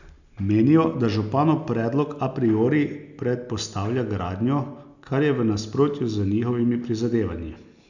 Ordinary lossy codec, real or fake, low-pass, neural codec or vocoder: none; real; 7.2 kHz; none